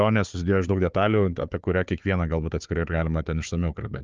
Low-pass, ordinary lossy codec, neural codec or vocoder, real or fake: 7.2 kHz; Opus, 24 kbps; codec, 16 kHz, 4 kbps, FunCodec, trained on Chinese and English, 50 frames a second; fake